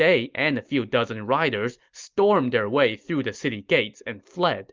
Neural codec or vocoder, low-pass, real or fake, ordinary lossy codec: none; 7.2 kHz; real; Opus, 24 kbps